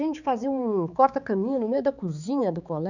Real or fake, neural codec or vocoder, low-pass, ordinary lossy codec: fake; codec, 16 kHz, 4 kbps, X-Codec, HuBERT features, trained on balanced general audio; 7.2 kHz; none